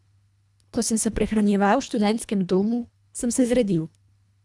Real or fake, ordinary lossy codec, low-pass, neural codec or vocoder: fake; none; none; codec, 24 kHz, 1.5 kbps, HILCodec